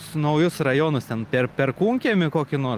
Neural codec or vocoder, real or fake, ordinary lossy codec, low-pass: none; real; Opus, 24 kbps; 14.4 kHz